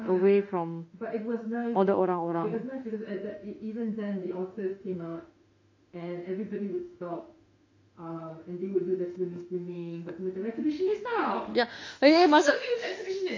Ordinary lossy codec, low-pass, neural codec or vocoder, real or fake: MP3, 48 kbps; 7.2 kHz; autoencoder, 48 kHz, 32 numbers a frame, DAC-VAE, trained on Japanese speech; fake